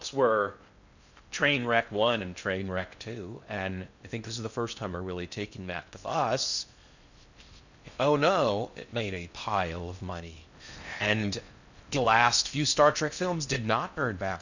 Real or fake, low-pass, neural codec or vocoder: fake; 7.2 kHz; codec, 16 kHz in and 24 kHz out, 0.6 kbps, FocalCodec, streaming, 2048 codes